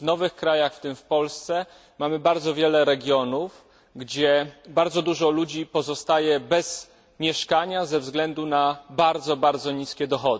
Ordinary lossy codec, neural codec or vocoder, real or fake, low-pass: none; none; real; none